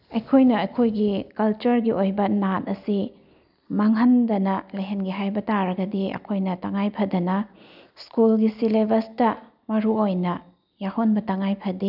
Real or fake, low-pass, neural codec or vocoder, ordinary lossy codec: real; 5.4 kHz; none; none